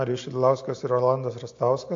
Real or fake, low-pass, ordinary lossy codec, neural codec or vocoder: real; 7.2 kHz; AAC, 48 kbps; none